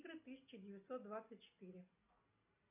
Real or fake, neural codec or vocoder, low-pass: real; none; 3.6 kHz